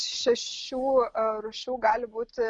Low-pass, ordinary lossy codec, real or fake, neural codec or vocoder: 9.9 kHz; Opus, 64 kbps; real; none